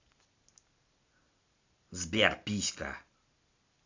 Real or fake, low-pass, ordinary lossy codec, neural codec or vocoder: real; 7.2 kHz; AAC, 48 kbps; none